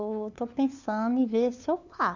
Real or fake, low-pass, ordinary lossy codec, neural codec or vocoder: fake; 7.2 kHz; Opus, 64 kbps; codec, 16 kHz, 2 kbps, FunCodec, trained on LibriTTS, 25 frames a second